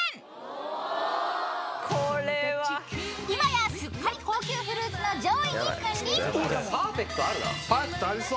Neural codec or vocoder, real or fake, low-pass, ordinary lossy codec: none; real; none; none